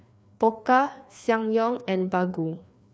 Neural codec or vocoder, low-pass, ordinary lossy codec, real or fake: codec, 16 kHz, 2 kbps, FreqCodec, larger model; none; none; fake